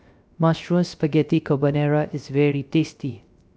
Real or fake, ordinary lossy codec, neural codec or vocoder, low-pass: fake; none; codec, 16 kHz, 0.3 kbps, FocalCodec; none